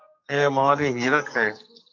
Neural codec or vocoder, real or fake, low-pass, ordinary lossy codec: codec, 44.1 kHz, 2.6 kbps, SNAC; fake; 7.2 kHz; MP3, 64 kbps